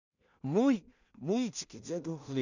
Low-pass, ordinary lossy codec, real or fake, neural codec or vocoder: 7.2 kHz; none; fake; codec, 16 kHz in and 24 kHz out, 0.4 kbps, LongCat-Audio-Codec, two codebook decoder